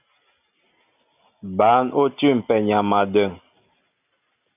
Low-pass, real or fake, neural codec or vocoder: 3.6 kHz; real; none